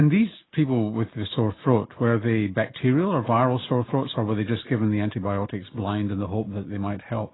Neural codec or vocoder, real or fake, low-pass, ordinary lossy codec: none; real; 7.2 kHz; AAC, 16 kbps